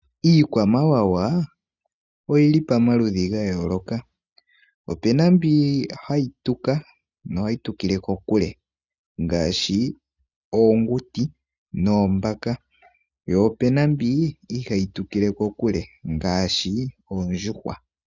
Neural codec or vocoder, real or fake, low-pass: none; real; 7.2 kHz